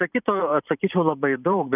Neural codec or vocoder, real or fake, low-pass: vocoder, 44.1 kHz, 128 mel bands every 512 samples, BigVGAN v2; fake; 3.6 kHz